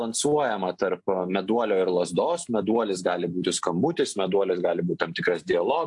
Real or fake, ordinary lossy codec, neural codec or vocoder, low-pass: real; AAC, 64 kbps; none; 10.8 kHz